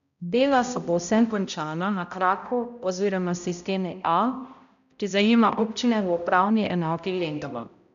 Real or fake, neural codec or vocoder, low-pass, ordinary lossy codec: fake; codec, 16 kHz, 0.5 kbps, X-Codec, HuBERT features, trained on balanced general audio; 7.2 kHz; none